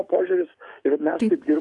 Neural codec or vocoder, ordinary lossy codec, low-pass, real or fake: codec, 44.1 kHz, 7.8 kbps, DAC; AAC, 64 kbps; 10.8 kHz; fake